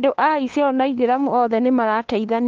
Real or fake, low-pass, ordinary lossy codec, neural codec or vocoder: fake; 7.2 kHz; Opus, 16 kbps; codec, 16 kHz, 2 kbps, FunCodec, trained on LibriTTS, 25 frames a second